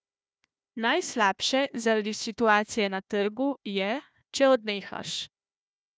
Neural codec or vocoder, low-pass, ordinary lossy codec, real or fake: codec, 16 kHz, 1 kbps, FunCodec, trained on Chinese and English, 50 frames a second; none; none; fake